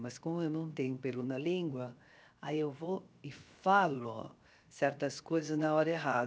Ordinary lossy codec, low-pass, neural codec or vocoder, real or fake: none; none; codec, 16 kHz, 0.7 kbps, FocalCodec; fake